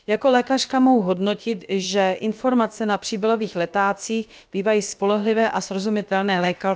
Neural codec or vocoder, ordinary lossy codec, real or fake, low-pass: codec, 16 kHz, about 1 kbps, DyCAST, with the encoder's durations; none; fake; none